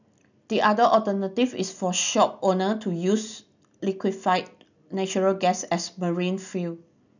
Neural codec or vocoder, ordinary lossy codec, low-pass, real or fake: none; none; 7.2 kHz; real